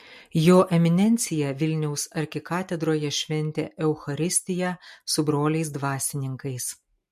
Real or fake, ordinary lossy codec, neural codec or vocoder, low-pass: real; MP3, 64 kbps; none; 14.4 kHz